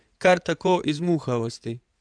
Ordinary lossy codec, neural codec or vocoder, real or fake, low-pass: none; codec, 16 kHz in and 24 kHz out, 2.2 kbps, FireRedTTS-2 codec; fake; 9.9 kHz